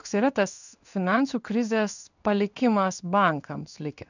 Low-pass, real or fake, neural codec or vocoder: 7.2 kHz; fake; codec, 16 kHz in and 24 kHz out, 1 kbps, XY-Tokenizer